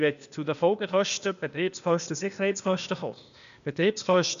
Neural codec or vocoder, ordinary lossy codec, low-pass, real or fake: codec, 16 kHz, 0.8 kbps, ZipCodec; none; 7.2 kHz; fake